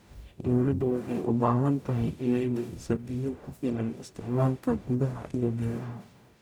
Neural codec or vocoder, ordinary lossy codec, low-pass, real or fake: codec, 44.1 kHz, 0.9 kbps, DAC; none; none; fake